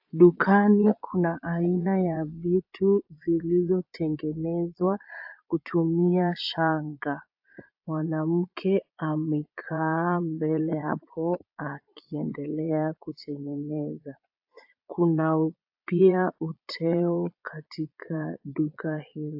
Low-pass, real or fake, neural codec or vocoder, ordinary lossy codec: 5.4 kHz; fake; vocoder, 22.05 kHz, 80 mel bands, Vocos; AAC, 48 kbps